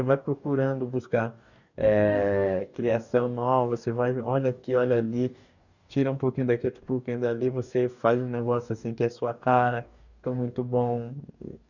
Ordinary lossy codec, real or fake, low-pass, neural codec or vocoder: none; fake; 7.2 kHz; codec, 44.1 kHz, 2.6 kbps, DAC